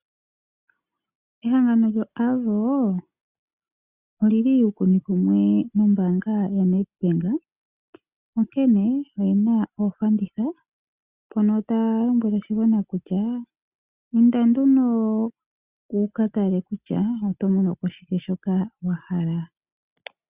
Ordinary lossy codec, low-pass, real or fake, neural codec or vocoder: Opus, 64 kbps; 3.6 kHz; real; none